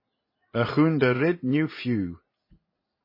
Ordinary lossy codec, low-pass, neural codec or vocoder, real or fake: MP3, 24 kbps; 5.4 kHz; vocoder, 44.1 kHz, 80 mel bands, Vocos; fake